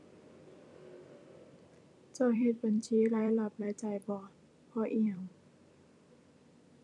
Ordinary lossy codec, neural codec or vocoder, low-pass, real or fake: none; vocoder, 44.1 kHz, 128 mel bands every 256 samples, BigVGAN v2; 10.8 kHz; fake